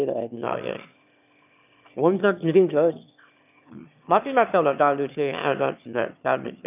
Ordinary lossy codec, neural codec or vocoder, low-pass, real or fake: none; autoencoder, 22.05 kHz, a latent of 192 numbers a frame, VITS, trained on one speaker; 3.6 kHz; fake